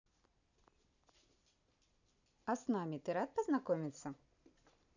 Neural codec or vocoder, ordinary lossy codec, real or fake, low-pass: none; none; real; 7.2 kHz